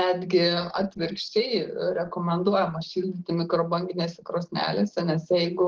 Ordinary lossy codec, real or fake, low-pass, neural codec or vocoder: Opus, 16 kbps; real; 7.2 kHz; none